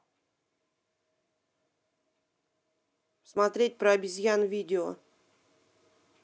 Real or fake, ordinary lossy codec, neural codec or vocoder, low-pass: real; none; none; none